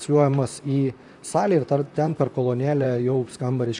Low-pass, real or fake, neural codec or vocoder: 10.8 kHz; fake; vocoder, 44.1 kHz, 128 mel bands, Pupu-Vocoder